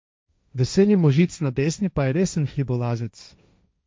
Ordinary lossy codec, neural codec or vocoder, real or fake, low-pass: none; codec, 16 kHz, 1.1 kbps, Voila-Tokenizer; fake; none